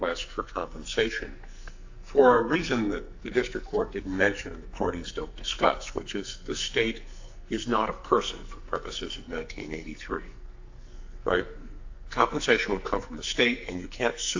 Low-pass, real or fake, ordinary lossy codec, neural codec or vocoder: 7.2 kHz; fake; AAC, 48 kbps; codec, 44.1 kHz, 2.6 kbps, SNAC